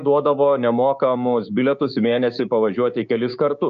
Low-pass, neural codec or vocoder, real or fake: 7.2 kHz; codec, 16 kHz, 6 kbps, DAC; fake